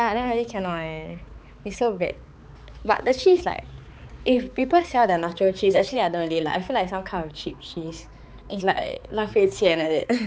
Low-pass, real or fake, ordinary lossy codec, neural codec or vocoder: none; fake; none; codec, 16 kHz, 4 kbps, X-Codec, HuBERT features, trained on balanced general audio